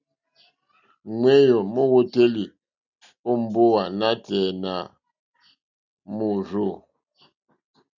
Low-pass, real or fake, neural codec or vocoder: 7.2 kHz; real; none